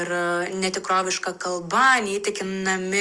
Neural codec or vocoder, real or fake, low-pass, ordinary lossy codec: none; real; 10.8 kHz; Opus, 32 kbps